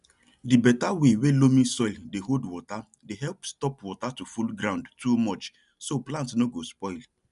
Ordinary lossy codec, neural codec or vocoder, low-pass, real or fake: none; none; 10.8 kHz; real